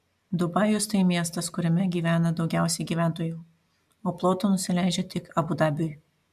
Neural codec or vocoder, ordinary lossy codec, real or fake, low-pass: none; MP3, 96 kbps; real; 14.4 kHz